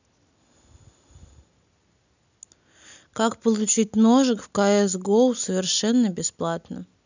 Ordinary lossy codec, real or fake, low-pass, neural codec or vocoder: none; real; 7.2 kHz; none